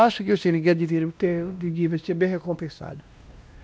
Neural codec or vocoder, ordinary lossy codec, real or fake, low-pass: codec, 16 kHz, 1 kbps, X-Codec, WavLM features, trained on Multilingual LibriSpeech; none; fake; none